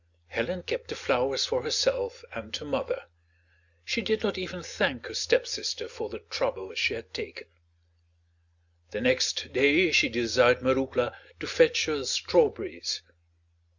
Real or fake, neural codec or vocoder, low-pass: real; none; 7.2 kHz